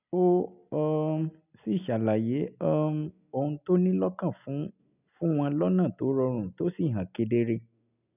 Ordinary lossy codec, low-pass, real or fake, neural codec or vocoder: none; 3.6 kHz; real; none